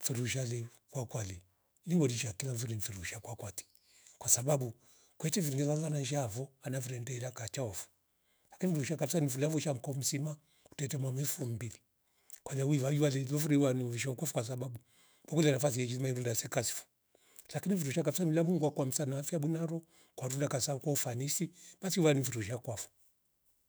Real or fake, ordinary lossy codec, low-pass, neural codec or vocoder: fake; none; none; autoencoder, 48 kHz, 128 numbers a frame, DAC-VAE, trained on Japanese speech